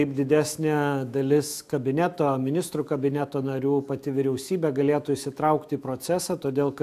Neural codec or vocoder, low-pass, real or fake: autoencoder, 48 kHz, 128 numbers a frame, DAC-VAE, trained on Japanese speech; 14.4 kHz; fake